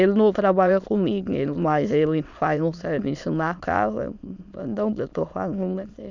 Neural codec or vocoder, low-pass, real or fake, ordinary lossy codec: autoencoder, 22.05 kHz, a latent of 192 numbers a frame, VITS, trained on many speakers; 7.2 kHz; fake; none